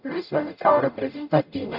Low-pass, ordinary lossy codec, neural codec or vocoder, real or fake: 5.4 kHz; none; codec, 44.1 kHz, 0.9 kbps, DAC; fake